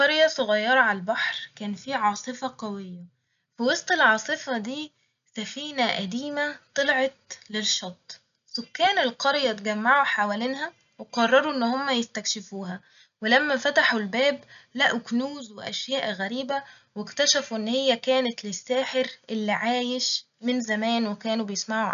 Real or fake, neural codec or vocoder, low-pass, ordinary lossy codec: real; none; 7.2 kHz; none